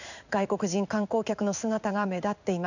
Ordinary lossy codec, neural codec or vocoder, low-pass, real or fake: none; codec, 16 kHz in and 24 kHz out, 1 kbps, XY-Tokenizer; 7.2 kHz; fake